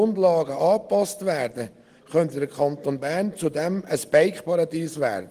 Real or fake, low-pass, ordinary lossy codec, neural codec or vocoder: real; 14.4 kHz; Opus, 24 kbps; none